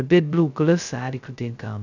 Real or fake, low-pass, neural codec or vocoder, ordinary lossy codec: fake; 7.2 kHz; codec, 16 kHz, 0.2 kbps, FocalCodec; none